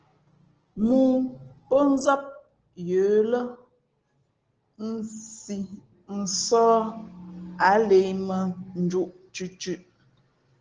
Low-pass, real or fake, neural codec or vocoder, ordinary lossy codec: 7.2 kHz; real; none; Opus, 16 kbps